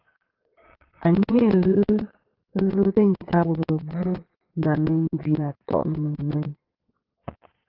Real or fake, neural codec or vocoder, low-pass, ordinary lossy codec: fake; vocoder, 22.05 kHz, 80 mel bands, Vocos; 5.4 kHz; Opus, 16 kbps